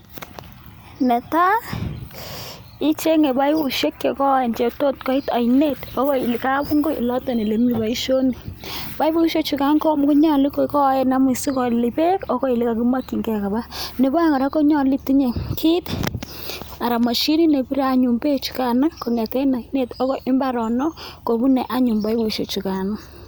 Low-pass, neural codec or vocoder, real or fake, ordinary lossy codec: none; none; real; none